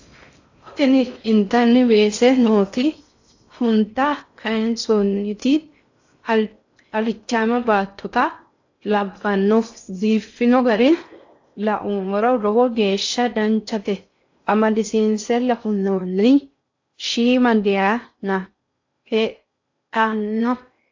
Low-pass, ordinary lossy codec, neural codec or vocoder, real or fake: 7.2 kHz; AAC, 48 kbps; codec, 16 kHz in and 24 kHz out, 0.6 kbps, FocalCodec, streaming, 4096 codes; fake